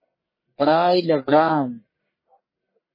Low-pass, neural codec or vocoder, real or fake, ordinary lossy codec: 5.4 kHz; codec, 44.1 kHz, 1.7 kbps, Pupu-Codec; fake; MP3, 24 kbps